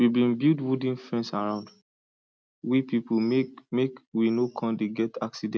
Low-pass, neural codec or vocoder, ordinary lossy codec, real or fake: none; none; none; real